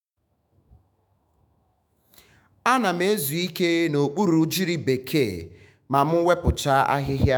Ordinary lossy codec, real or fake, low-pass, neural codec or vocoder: none; fake; none; autoencoder, 48 kHz, 128 numbers a frame, DAC-VAE, trained on Japanese speech